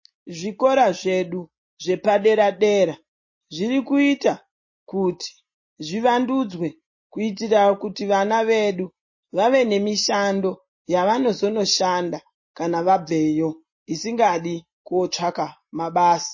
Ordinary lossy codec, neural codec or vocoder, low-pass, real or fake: MP3, 32 kbps; none; 7.2 kHz; real